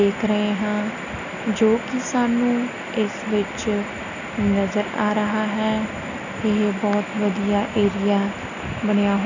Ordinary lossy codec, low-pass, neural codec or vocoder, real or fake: none; 7.2 kHz; none; real